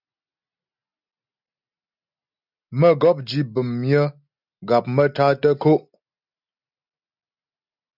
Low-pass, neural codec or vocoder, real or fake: 5.4 kHz; none; real